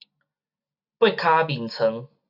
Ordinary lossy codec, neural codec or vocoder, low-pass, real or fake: AAC, 48 kbps; none; 5.4 kHz; real